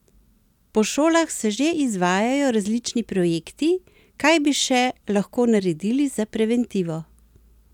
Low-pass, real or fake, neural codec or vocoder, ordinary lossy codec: 19.8 kHz; real; none; none